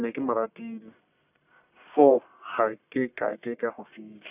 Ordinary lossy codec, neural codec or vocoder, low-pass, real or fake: none; codec, 44.1 kHz, 1.7 kbps, Pupu-Codec; 3.6 kHz; fake